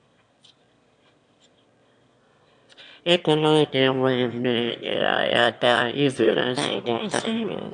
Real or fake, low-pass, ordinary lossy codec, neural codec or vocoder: fake; 9.9 kHz; MP3, 64 kbps; autoencoder, 22.05 kHz, a latent of 192 numbers a frame, VITS, trained on one speaker